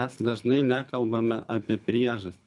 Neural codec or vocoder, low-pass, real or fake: codec, 24 kHz, 3 kbps, HILCodec; 10.8 kHz; fake